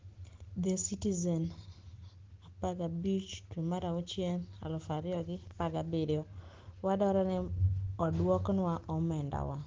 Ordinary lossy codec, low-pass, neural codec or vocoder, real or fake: Opus, 16 kbps; 7.2 kHz; none; real